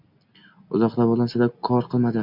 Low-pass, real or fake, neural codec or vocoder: 5.4 kHz; real; none